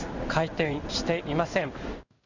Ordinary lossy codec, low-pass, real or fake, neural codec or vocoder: none; 7.2 kHz; fake; codec, 16 kHz in and 24 kHz out, 1 kbps, XY-Tokenizer